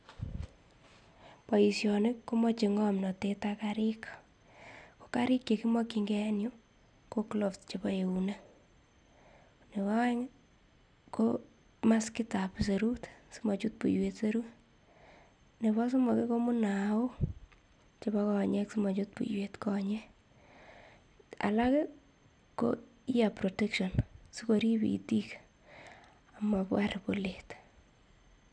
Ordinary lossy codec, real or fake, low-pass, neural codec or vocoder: none; real; 9.9 kHz; none